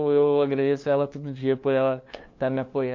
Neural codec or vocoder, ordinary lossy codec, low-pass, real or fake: codec, 16 kHz, 2 kbps, FunCodec, trained on LibriTTS, 25 frames a second; MP3, 48 kbps; 7.2 kHz; fake